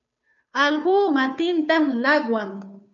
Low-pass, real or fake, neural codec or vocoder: 7.2 kHz; fake; codec, 16 kHz, 2 kbps, FunCodec, trained on Chinese and English, 25 frames a second